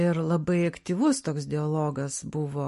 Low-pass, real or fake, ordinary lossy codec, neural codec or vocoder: 14.4 kHz; real; MP3, 48 kbps; none